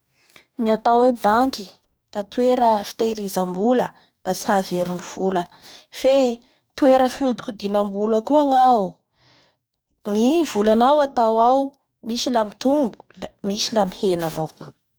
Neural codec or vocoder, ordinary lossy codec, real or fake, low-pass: codec, 44.1 kHz, 2.6 kbps, DAC; none; fake; none